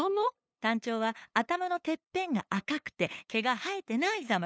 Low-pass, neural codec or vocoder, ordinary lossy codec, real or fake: none; codec, 16 kHz, 8 kbps, FunCodec, trained on LibriTTS, 25 frames a second; none; fake